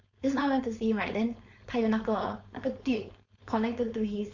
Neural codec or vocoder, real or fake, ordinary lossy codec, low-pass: codec, 16 kHz, 4.8 kbps, FACodec; fake; Opus, 64 kbps; 7.2 kHz